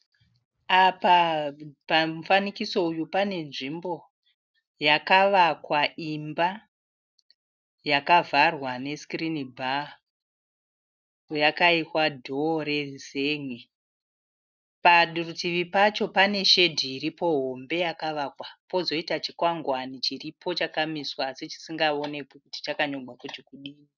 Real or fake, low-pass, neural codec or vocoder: real; 7.2 kHz; none